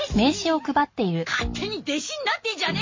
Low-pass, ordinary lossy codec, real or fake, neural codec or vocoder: 7.2 kHz; MP3, 32 kbps; real; none